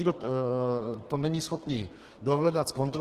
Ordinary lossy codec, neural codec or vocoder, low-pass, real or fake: Opus, 16 kbps; codec, 32 kHz, 1.9 kbps, SNAC; 14.4 kHz; fake